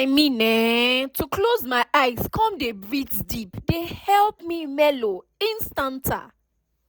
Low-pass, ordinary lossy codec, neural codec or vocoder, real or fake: none; none; none; real